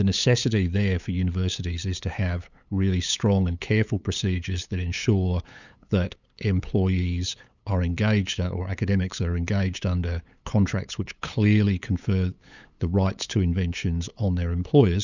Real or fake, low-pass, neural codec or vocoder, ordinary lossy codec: fake; 7.2 kHz; codec, 16 kHz, 8 kbps, FunCodec, trained on LibriTTS, 25 frames a second; Opus, 64 kbps